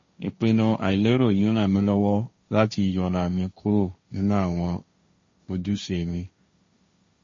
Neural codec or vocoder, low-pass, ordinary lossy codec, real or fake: codec, 16 kHz, 1.1 kbps, Voila-Tokenizer; 7.2 kHz; MP3, 32 kbps; fake